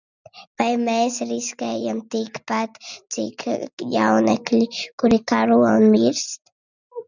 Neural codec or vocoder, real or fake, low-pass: none; real; 7.2 kHz